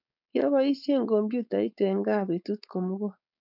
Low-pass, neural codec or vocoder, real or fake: 5.4 kHz; codec, 16 kHz, 4.8 kbps, FACodec; fake